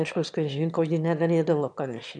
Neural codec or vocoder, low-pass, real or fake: autoencoder, 22.05 kHz, a latent of 192 numbers a frame, VITS, trained on one speaker; 9.9 kHz; fake